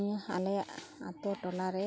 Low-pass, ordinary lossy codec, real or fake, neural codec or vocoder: none; none; real; none